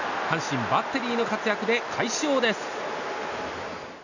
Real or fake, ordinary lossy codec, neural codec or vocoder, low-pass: real; none; none; 7.2 kHz